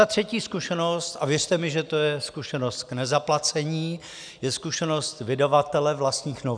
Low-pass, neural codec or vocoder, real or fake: 9.9 kHz; none; real